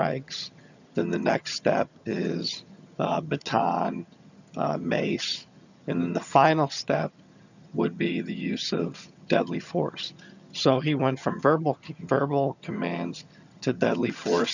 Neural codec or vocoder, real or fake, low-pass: vocoder, 22.05 kHz, 80 mel bands, HiFi-GAN; fake; 7.2 kHz